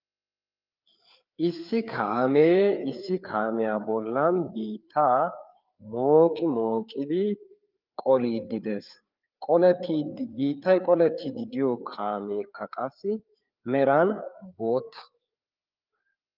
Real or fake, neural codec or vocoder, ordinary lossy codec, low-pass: fake; codec, 16 kHz, 4 kbps, FreqCodec, larger model; Opus, 24 kbps; 5.4 kHz